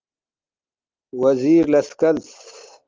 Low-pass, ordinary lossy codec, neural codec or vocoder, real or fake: 7.2 kHz; Opus, 32 kbps; none; real